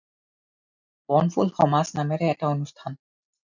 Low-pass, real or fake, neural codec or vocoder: 7.2 kHz; real; none